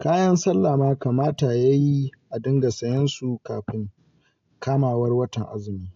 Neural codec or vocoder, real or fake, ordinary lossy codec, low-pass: none; real; AAC, 48 kbps; 7.2 kHz